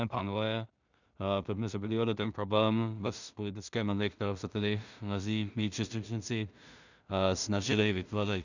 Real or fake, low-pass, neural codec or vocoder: fake; 7.2 kHz; codec, 16 kHz in and 24 kHz out, 0.4 kbps, LongCat-Audio-Codec, two codebook decoder